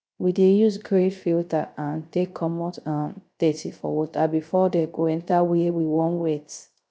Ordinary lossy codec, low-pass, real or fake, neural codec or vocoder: none; none; fake; codec, 16 kHz, 0.3 kbps, FocalCodec